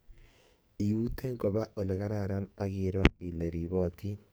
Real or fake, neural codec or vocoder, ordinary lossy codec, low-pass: fake; codec, 44.1 kHz, 2.6 kbps, SNAC; none; none